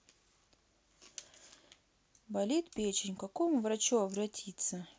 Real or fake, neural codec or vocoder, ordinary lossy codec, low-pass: real; none; none; none